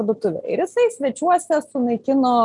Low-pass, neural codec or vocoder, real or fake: 10.8 kHz; none; real